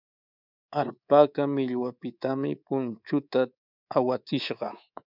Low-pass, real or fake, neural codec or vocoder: 5.4 kHz; fake; codec, 24 kHz, 3.1 kbps, DualCodec